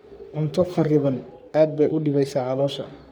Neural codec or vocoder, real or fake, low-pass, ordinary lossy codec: codec, 44.1 kHz, 3.4 kbps, Pupu-Codec; fake; none; none